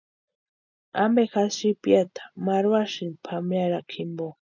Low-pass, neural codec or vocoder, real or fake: 7.2 kHz; none; real